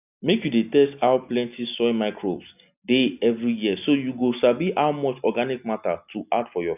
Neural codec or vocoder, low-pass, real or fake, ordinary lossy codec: none; 3.6 kHz; real; none